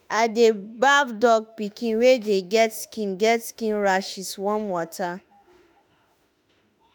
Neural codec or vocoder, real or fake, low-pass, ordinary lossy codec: autoencoder, 48 kHz, 32 numbers a frame, DAC-VAE, trained on Japanese speech; fake; none; none